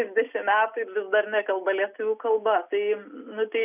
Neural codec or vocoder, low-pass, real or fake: none; 3.6 kHz; real